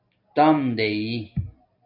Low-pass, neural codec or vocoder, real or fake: 5.4 kHz; none; real